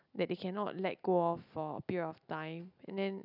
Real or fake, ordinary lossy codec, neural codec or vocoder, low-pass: real; none; none; 5.4 kHz